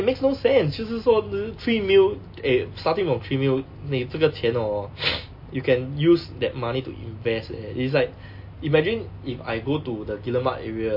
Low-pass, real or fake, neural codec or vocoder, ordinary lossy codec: 5.4 kHz; real; none; MP3, 32 kbps